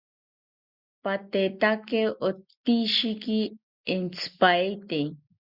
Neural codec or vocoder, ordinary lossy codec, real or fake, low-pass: none; Opus, 64 kbps; real; 5.4 kHz